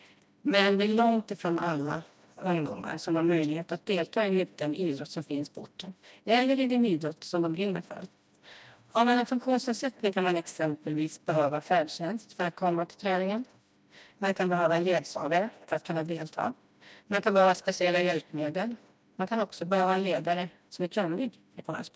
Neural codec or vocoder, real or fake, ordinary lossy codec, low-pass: codec, 16 kHz, 1 kbps, FreqCodec, smaller model; fake; none; none